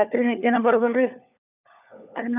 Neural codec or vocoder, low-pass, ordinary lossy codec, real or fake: codec, 16 kHz, 4 kbps, FunCodec, trained on LibriTTS, 50 frames a second; 3.6 kHz; none; fake